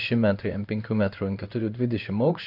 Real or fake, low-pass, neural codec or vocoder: fake; 5.4 kHz; codec, 16 kHz in and 24 kHz out, 1 kbps, XY-Tokenizer